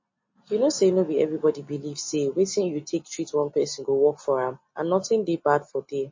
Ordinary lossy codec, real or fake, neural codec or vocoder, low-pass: MP3, 32 kbps; real; none; 7.2 kHz